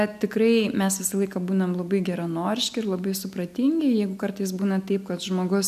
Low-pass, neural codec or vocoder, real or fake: 14.4 kHz; none; real